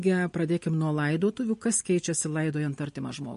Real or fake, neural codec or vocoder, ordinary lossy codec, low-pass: real; none; MP3, 48 kbps; 10.8 kHz